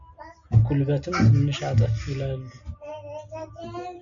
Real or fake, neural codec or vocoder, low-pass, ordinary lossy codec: real; none; 7.2 kHz; MP3, 96 kbps